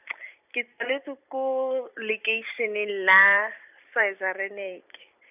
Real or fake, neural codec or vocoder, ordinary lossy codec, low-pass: fake; vocoder, 44.1 kHz, 128 mel bands every 256 samples, BigVGAN v2; none; 3.6 kHz